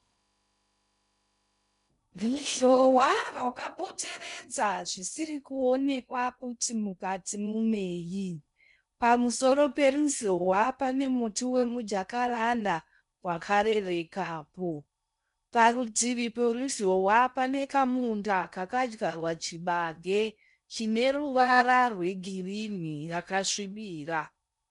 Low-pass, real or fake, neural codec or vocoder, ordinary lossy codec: 10.8 kHz; fake; codec, 16 kHz in and 24 kHz out, 0.6 kbps, FocalCodec, streaming, 2048 codes; MP3, 96 kbps